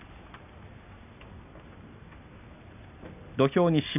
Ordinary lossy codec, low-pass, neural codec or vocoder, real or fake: none; 3.6 kHz; none; real